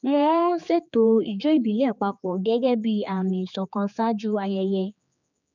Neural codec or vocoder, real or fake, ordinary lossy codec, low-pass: codec, 16 kHz, 4 kbps, X-Codec, HuBERT features, trained on general audio; fake; none; 7.2 kHz